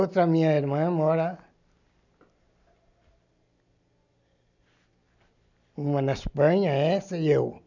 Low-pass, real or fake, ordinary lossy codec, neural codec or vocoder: 7.2 kHz; real; none; none